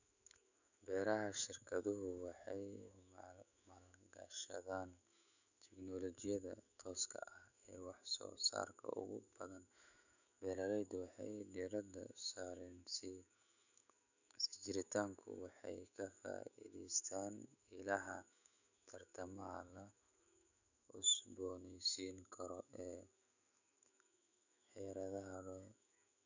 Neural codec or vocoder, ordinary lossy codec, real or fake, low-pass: autoencoder, 48 kHz, 128 numbers a frame, DAC-VAE, trained on Japanese speech; none; fake; 7.2 kHz